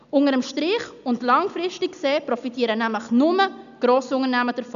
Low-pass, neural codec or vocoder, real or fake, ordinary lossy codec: 7.2 kHz; none; real; none